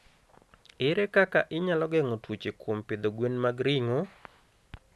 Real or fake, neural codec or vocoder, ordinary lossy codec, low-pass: real; none; none; none